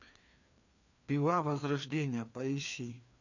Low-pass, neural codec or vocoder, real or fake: 7.2 kHz; codec, 16 kHz, 2 kbps, FreqCodec, larger model; fake